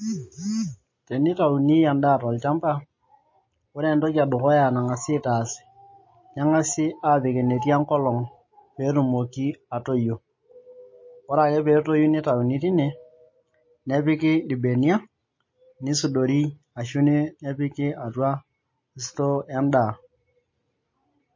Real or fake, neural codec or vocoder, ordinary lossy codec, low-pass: real; none; MP3, 32 kbps; 7.2 kHz